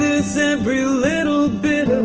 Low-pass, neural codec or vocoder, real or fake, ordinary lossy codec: 7.2 kHz; none; real; Opus, 16 kbps